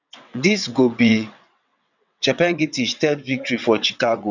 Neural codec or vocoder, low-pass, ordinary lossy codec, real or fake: vocoder, 22.05 kHz, 80 mel bands, WaveNeXt; 7.2 kHz; none; fake